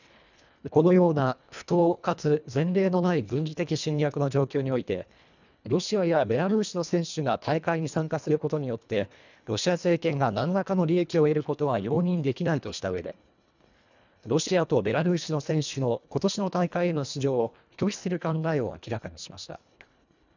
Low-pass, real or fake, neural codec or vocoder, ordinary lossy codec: 7.2 kHz; fake; codec, 24 kHz, 1.5 kbps, HILCodec; none